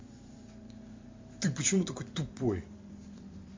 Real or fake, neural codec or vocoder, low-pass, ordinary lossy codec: fake; vocoder, 44.1 kHz, 128 mel bands every 256 samples, BigVGAN v2; 7.2 kHz; MP3, 48 kbps